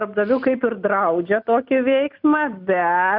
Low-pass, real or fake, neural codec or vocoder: 5.4 kHz; real; none